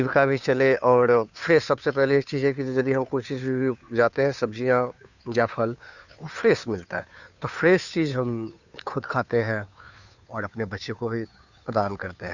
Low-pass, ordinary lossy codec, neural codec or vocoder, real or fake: 7.2 kHz; none; codec, 16 kHz, 2 kbps, FunCodec, trained on Chinese and English, 25 frames a second; fake